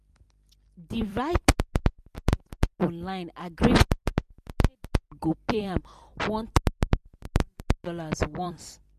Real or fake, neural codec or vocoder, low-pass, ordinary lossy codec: fake; vocoder, 44.1 kHz, 128 mel bands every 256 samples, BigVGAN v2; 14.4 kHz; MP3, 64 kbps